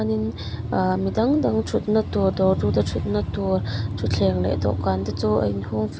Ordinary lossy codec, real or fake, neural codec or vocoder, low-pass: none; real; none; none